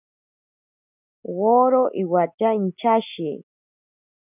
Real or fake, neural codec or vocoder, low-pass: real; none; 3.6 kHz